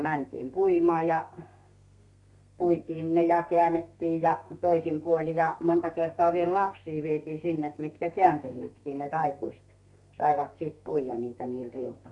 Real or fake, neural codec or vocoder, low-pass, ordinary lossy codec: fake; codec, 32 kHz, 1.9 kbps, SNAC; 10.8 kHz; none